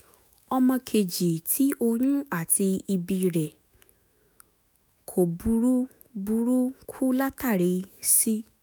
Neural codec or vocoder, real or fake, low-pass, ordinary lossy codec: autoencoder, 48 kHz, 128 numbers a frame, DAC-VAE, trained on Japanese speech; fake; none; none